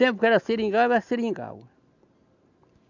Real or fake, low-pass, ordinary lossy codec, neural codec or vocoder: real; 7.2 kHz; none; none